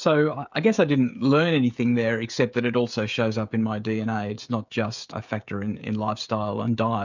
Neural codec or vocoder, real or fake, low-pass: codec, 16 kHz, 16 kbps, FreqCodec, smaller model; fake; 7.2 kHz